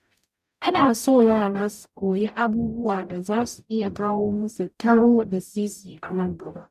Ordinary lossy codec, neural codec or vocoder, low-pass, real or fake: none; codec, 44.1 kHz, 0.9 kbps, DAC; 14.4 kHz; fake